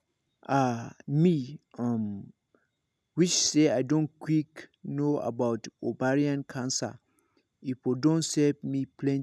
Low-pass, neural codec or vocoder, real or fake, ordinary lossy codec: none; none; real; none